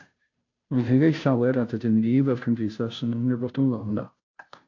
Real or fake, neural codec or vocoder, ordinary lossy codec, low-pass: fake; codec, 16 kHz, 0.5 kbps, FunCodec, trained on Chinese and English, 25 frames a second; AAC, 48 kbps; 7.2 kHz